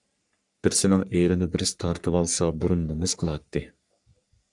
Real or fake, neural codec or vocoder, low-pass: fake; codec, 44.1 kHz, 3.4 kbps, Pupu-Codec; 10.8 kHz